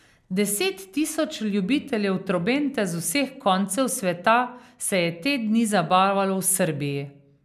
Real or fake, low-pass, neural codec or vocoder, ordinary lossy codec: real; 14.4 kHz; none; none